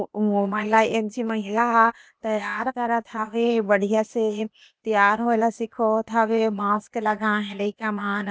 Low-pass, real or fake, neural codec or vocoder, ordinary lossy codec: none; fake; codec, 16 kHz, 0.8 kbps, ZipCodec; none